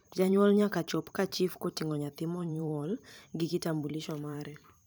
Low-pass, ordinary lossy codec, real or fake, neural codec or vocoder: none; none; fake; vocoder, 44.1 kHz, 128 mel bands every 512 samples, BigVGAN v2